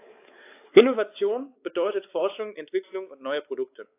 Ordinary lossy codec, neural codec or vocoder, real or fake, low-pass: AAC, 24 kbps; codec, 16 kHz, 4 kbps, X-Codec, WavLM features, trained on Multilingual LibriSpeech; fake; 3.6 kHz